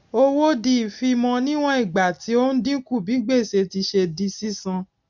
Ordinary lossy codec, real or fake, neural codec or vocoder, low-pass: none; real; none; 7.2 kHz